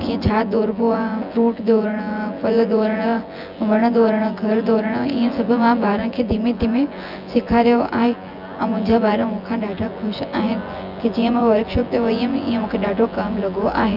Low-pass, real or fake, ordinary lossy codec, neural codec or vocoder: 5.4 kHz; fake; none; vocoder, 24 kHz, 100 mel bands, Vocos